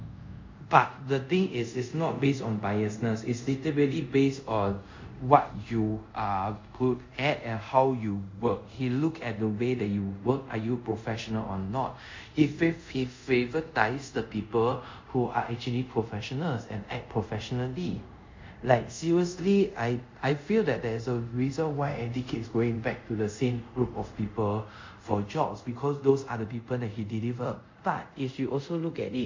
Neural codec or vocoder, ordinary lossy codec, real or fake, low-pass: codec, 24 kHz, 0.5 kbps, DualCodec; MP3, 48 kbps; fake; 7.2 kHz